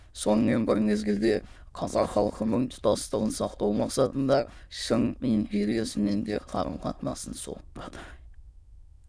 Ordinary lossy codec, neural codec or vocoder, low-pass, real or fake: none; autoencoder, 22.05 kHz, a latent of 192 numbers a frame, VITS, trained on many speakers; none; fake